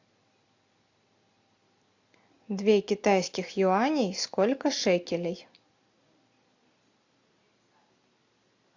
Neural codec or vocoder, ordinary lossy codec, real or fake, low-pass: none; AAC, 48 kbps; real; 7.2 kHz